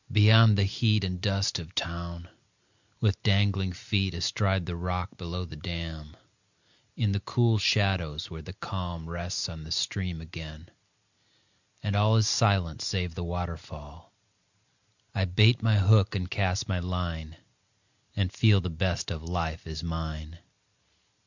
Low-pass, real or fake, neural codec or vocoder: 7.2 kHz; real; none